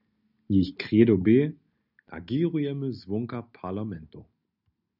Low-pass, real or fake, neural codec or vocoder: 5.4 kHz; real; none